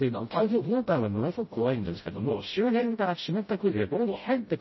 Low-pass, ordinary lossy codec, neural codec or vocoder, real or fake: 7.2 kHz; MP3, 24 kbps; codec, 16 kHz, 0.5 kbps, FreqCodec, smaller model; fake